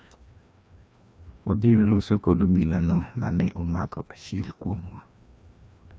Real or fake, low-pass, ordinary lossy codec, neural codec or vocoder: fake; none; none; codec, 16 kHz, 1 kbps, FreqCodec, larger model